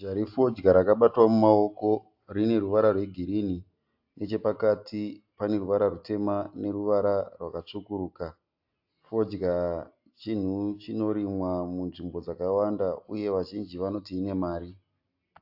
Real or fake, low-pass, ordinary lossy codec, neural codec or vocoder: real; 5.4 kHz; AAC, 48 kbps; none